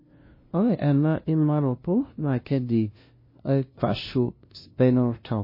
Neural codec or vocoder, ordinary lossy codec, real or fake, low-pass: codec, 16 kHz, 0.5 kbps, FunCodec, trained on LibriTTS, 25 frames a second; MP3, 24 kbps; fake; 5.4 kHz